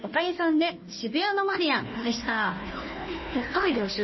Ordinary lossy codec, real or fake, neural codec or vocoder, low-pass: MP3, 24 kbps; fake; codec, 16 kHz, 1 kbps, FunCodec, trained on Chinese and English, 50 frames a second; 7.2 kHz